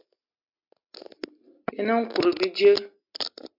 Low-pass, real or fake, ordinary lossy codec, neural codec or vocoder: 5.4 kHz; real; MP3, 48 kbps; none